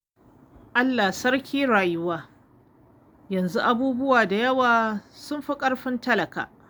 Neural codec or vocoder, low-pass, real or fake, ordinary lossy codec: none; none; real; none